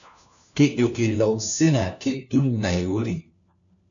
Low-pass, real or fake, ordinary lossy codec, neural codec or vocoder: 7.2 kHz; fake; MP3, 96 kbps; codec, 16 kHz, 1 kbps, FunCodec, trained on LibriTTS, 50 frames a second